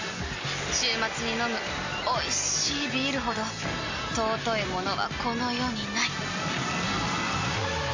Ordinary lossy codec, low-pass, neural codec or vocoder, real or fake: AAC, 48 kbps; 7.2 kHz; none; real